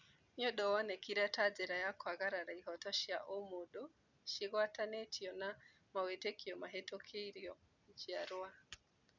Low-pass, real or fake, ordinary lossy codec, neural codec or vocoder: 7.2 kHz; real; none; none